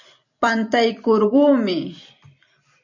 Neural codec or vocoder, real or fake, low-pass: vocoder, 44.1 kHz, 128 mel bands every 256 samples, BigVGAN v2; fake; 7.2 kHz